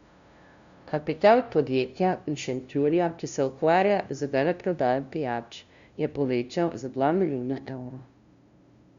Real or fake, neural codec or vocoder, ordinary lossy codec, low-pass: fake; codec, 16 kHz, 0.5 kbps, FunCodec, trained on LibriTTS, 25 frames a second; Opus, 64 kbps; 7.2 kHz